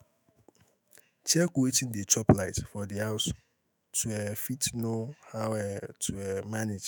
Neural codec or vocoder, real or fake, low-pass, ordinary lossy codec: autoencoder, 48 kHz, 128 numbers a frame, DAC-VAE, trained on Japanese speech; fake; none; none